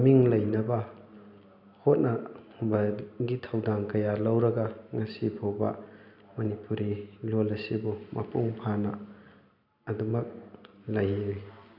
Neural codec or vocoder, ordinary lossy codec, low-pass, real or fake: none; none; 5.4 kHz; real